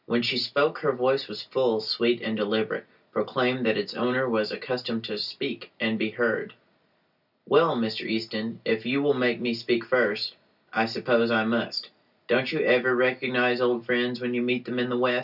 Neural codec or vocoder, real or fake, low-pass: none; real; 5.4 kHz